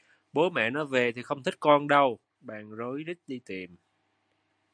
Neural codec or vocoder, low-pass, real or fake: none; 9.9 kHz; real